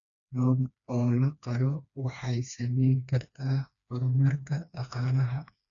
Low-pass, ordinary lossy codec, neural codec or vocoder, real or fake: 7.2 kHz; none; codec, 16 kHz, 2 kbps, FreqCodec, smaller model; fake